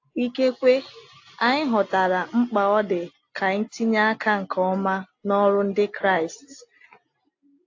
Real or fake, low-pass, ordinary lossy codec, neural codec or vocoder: real; 7.2 kHz; AAC, 48 kbps; none